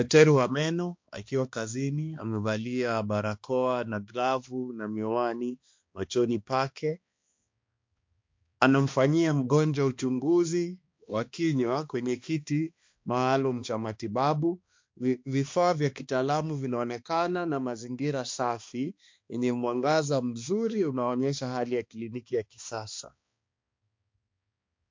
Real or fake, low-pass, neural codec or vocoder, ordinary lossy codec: fake; 7.2 kHz; codec, 16 kHz, 2 kbps, X-Codec, HuBERT features, trained on balanced general audio; MP3, 48 kbps